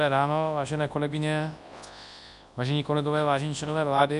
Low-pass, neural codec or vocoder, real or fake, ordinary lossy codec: 10.8 kHz; codec, 24 kHz, 0.9 kbps, WavTokenizer, large speech release; fake; AAC, 96 kbps